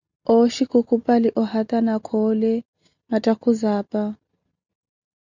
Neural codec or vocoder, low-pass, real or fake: none; 7.2 kHz; real